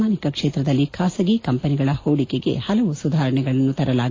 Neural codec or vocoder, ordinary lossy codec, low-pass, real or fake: none; AAC, 32 kbps; 7.2 kHz; real